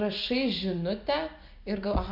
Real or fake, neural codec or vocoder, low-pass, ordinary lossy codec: real; none; 5.4 kHz; MP3, 48 kbps